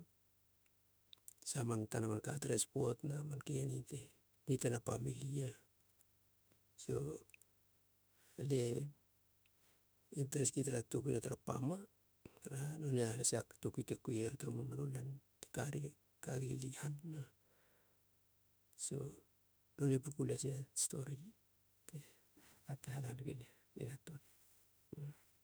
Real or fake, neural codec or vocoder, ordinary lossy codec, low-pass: fake; autoencoder, 48 kHz, 32 numbers a frame, DAC-VAE, trained on Japanese speech; none; none